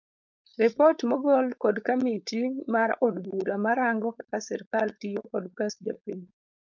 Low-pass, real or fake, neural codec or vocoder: 7.2 kHz; fake; codec, 16 kHz, 4.8 kbps, FACodec